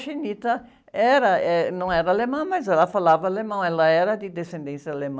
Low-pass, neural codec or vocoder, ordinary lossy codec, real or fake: none; none; none; real